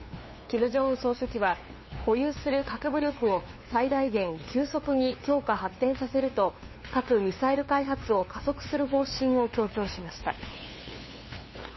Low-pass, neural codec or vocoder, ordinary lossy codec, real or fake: 7.2 kHz; codec, 16 kHz, 2 kbps, FunCodec, trained on LibriTTS, 25 frames a second; MP3, 24 kbps; fake